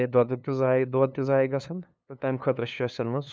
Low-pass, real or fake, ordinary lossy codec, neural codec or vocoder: none; fake; none; codec, 16 kHz, 2 kbps, FunCodec, trained on LibriTTS, 25 frames a second